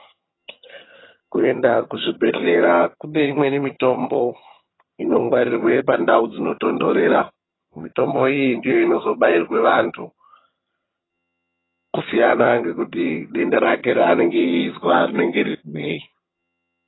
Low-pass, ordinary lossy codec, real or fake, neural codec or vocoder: 7.2 kHz; AAC, 16 kbps; fake; vocoder, 22.05 kHz, 80 mel bands, HiFi-GAN